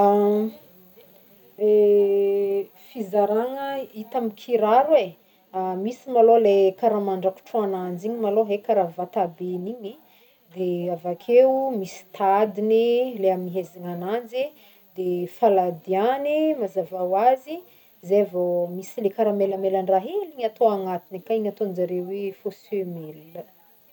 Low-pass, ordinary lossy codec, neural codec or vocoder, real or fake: 19.8 kHz; none; none; real